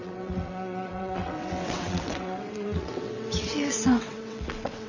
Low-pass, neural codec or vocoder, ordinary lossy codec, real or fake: 7.2 kHz; vocoder, 22.05 kHz, 80 mel bands, WaveNeXt; none; fake